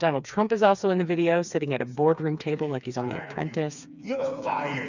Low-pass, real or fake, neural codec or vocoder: 7.2 kHz; fake; codec, 16 kHz, 4 kbps, FreqCodec, smaller model